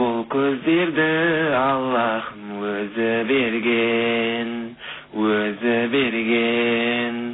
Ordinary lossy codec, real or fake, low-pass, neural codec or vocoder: AAC, 16 kbps; real; 7.2 kHz; none